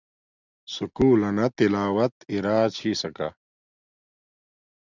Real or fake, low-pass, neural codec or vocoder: real; 7.2 kHz; none